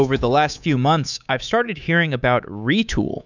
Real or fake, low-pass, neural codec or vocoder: fake; 7.2 kHz; codec, 24 kHz, 3.1 kbps, DualCodec